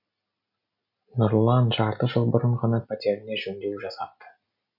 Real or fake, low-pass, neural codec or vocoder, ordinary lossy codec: real; 5.4 kHz; none; none